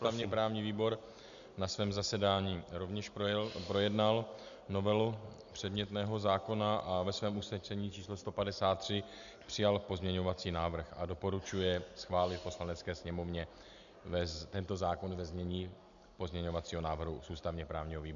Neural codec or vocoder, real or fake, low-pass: none; real; 7.2 kHz